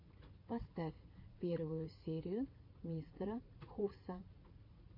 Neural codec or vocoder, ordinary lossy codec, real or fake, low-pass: vocoder, 44.1 kHz, 80 mel bands, Vocos; MP3, 32 kbps; fake; 5.4 kHz